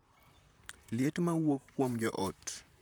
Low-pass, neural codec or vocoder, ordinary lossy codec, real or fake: none; vocoder, 44.1 kHz, 128 mel bands, Pupu-Vocoder; none; fake